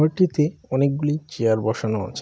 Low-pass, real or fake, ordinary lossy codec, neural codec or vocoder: none; real; none; none